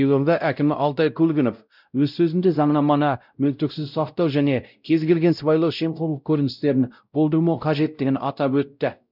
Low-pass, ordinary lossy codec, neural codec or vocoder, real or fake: 5.4 kHz; none; codec, 16 kHz, 0.5 kbps, X-Codec, WavLM features, trained on Multilingual LibriSpeech; fake